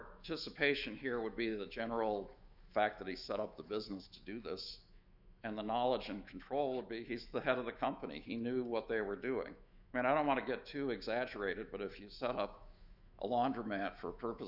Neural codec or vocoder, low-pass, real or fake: autoencoder, 48 kHz, 128 numbers a frame, DAC-VAE, trained on Japanese speech; 5.4 kHz; fake